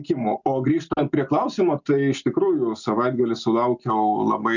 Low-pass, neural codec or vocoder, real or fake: 7.2 kHz; none; real